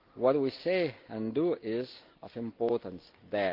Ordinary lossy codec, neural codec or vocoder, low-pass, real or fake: Opus, 24 kbps; none; 5.4 kHz; real